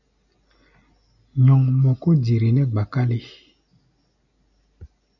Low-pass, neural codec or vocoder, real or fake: 7.2 kHz; none; real